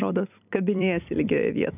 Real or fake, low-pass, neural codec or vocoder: fake; 3.6 kHz; vocoder, 44.1 kHz, 128 mel bands every 256 samples, BigVGAN v2